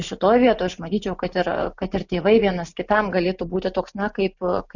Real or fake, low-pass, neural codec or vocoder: real; 7.2 kHz; none